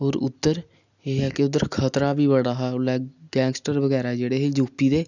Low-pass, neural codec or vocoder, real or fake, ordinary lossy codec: 7.2 kHz; none; real; none